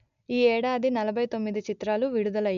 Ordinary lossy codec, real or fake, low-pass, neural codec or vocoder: none; real; 7.2 kHz; none